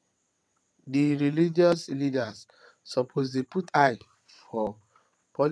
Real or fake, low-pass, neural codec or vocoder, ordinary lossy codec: fake; none; vocoder, 22.05 kHz, 80 mel bands, WaveNeXt; none